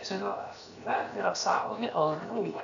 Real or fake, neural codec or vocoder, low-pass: fake; codec, 16 kHz, 0.7 kbps, FocalCodec; 7.2 kHz